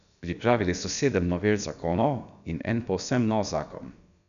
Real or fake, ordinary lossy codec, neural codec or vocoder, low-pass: fake; none; codec, 16 kHz, about 1 kbps, DyCAST, with the encoder's durations; 7.2 kHz